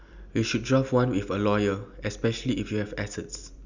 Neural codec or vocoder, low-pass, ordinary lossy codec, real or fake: none; 7.2 kHz; none; real